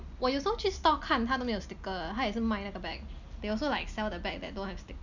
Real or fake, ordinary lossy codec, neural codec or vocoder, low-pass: real; none; none; 7.2 kHz